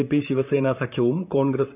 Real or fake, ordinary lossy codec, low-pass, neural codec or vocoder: fake; none; 3.6 kHz; vocoder, 44.1 kHz, 128 mel bands, Pupu-Vocoder